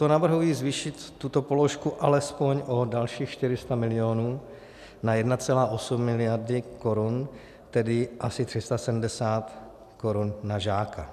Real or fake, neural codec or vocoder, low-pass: real; none; 14.4 kHz